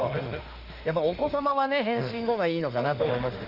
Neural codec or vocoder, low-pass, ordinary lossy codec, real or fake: autoencoder, 48 kHz, 32 numbers a frame, DAC-VAE, trained on Japanese speech; 5.4 kHz; Opus, 32 kbps; fake